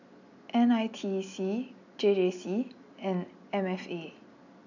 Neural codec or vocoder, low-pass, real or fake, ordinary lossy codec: none; 7.2 kHz; real; none